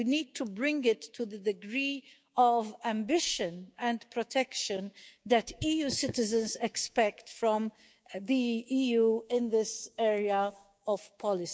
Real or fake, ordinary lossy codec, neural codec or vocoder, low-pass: fake; none; codec, 16 kHz, 6 kbps, DAC; none